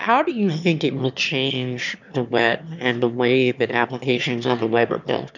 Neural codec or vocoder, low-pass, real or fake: autoencoder, 22.05 kHz, a latent of 192 numbers a frame, VITS, trained on one speaker; 7.2 kHz; fake